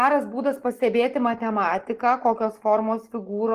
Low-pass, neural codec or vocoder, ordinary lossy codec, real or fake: 14.4 kHz; none; Opus, 16 kbps; real